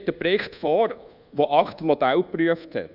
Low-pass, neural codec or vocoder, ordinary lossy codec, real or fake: 5.4 kHz; codec, 24 kHz, 1.2 kbps, DualCodec; none; fake